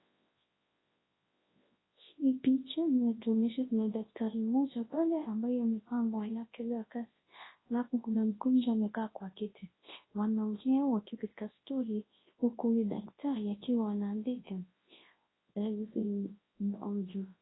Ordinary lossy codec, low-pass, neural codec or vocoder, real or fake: AAC, 16 kbps; 7.2 kHz; codec, 24 kHz, 0.9 kbps, WavTokenizer, large speech release; fake